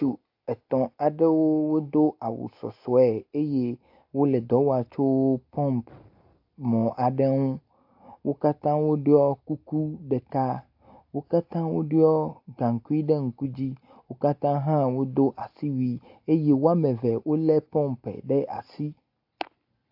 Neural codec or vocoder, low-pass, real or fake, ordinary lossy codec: none; 5.4 kHz; real; AAC, 48 kbps